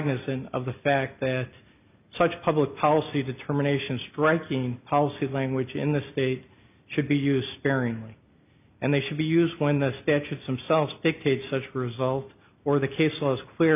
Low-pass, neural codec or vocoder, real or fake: 3.6 kHz; none; real